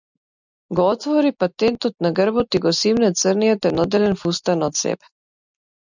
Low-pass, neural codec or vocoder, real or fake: 7.2 kHz; none; real